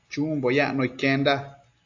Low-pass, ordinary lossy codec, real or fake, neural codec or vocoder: 7.2 kHz; AAC, 48 kbps; real; none